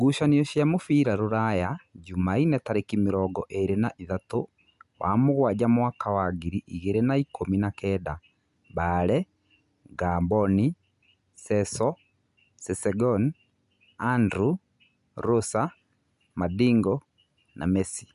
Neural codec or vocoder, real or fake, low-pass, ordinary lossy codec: none; real; 10.8 kHz; none